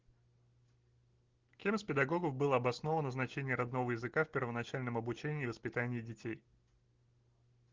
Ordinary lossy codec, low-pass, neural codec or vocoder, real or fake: Opus, 16 kbps; 7.2 kHz; none; real